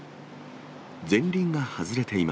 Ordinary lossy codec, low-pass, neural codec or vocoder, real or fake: none; none; none; real